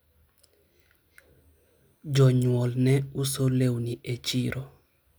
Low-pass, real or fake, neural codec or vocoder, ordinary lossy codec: none; real; none; none